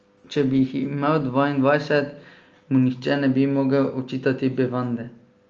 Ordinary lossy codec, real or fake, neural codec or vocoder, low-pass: Opus, 24 kbps; real; none; 7.2 kHz